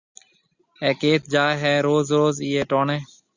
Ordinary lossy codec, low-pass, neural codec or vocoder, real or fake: Opus, 64 kbps; 7.2 kHz; none; real